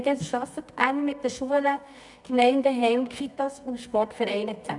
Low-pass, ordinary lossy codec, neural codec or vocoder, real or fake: 10.8 kHz; none; codec, 24 kHz, 0.9 kbps, WavTokenizer, medium music audio release; fake